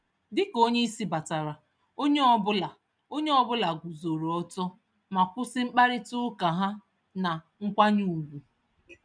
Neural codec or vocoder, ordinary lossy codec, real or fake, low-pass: none; none; real; 9.9 kHz